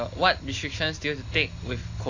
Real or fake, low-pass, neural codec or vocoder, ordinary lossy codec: real; 7.2 kHz; none; AAC, 48 kbps